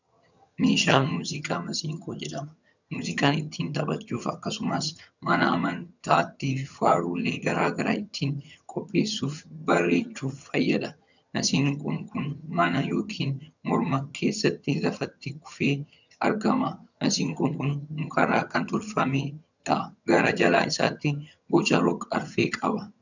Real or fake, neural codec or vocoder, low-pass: fake; vocoder, 22.05 kHz, 80 mel bands, HiFi-GAN; 7.2 kHz